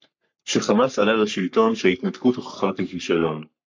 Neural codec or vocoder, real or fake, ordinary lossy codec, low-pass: codec, 44.1 kHz, 3.4 kbps, Pupu-Codec; fake; MP3, 48 kbps; 7.2 kHz